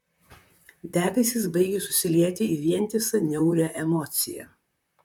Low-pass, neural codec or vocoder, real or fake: 19.8 kHz; vocoder, 44.1 kHz, 128 mel bands, Pupu-Vocoder; fake